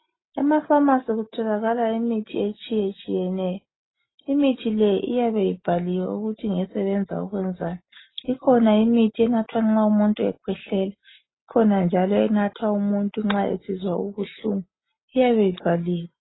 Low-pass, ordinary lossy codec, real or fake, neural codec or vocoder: 7.2 kHz; AAC, 16 kbps; real; none